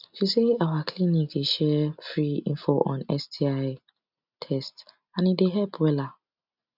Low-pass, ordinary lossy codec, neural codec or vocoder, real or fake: 5.4 kHz; none; none; real